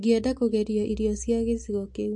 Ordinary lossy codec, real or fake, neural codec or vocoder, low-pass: MP3, 48 kbps; real; none; 10.8 kHz